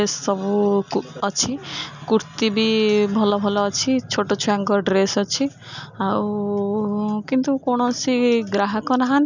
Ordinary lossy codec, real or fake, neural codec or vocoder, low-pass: none; real; none; 7.2 kHz